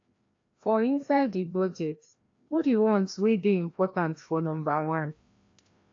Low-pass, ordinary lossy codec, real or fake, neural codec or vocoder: 7.2 kHz; AAC, 48 kbps; fake; codec, 16 kHz, 1 kbps, FreqCodec, larger model